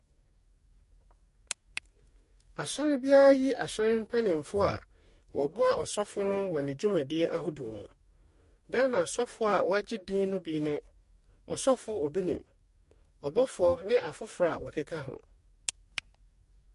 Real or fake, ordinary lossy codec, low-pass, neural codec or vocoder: fake; MP3, 48 kbps; 14.4 kHz; codec, 44.1 kHz, 2.6 kbps, DAC